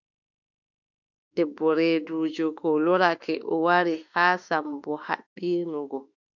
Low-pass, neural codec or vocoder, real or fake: 7.2 kHz; autoencoder, 48 kHz, 32 numbers a frame, DAC-VAE, trained on Japanese speech; fake